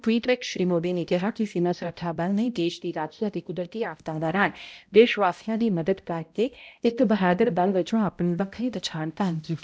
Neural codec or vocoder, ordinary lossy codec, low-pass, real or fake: codec, 16 kHz, 0.5 kbps, X-Codec, HuBERT features, trained on balanced general audio; none; none; fake